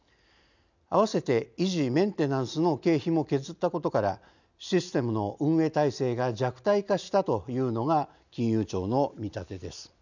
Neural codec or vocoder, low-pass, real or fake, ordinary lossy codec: none; 7.2 kHz; real; none